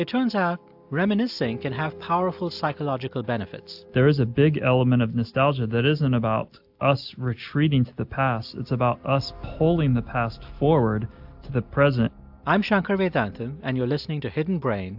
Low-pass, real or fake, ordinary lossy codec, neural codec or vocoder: 5.4 kHz; real; AAC, 48 kbps; none